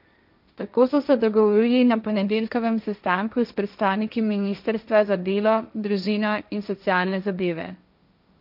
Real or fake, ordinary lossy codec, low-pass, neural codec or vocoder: fake; none; 5.4 kHz; codec, 16 kHz, 1.1 kbps, Voila-Tokenizer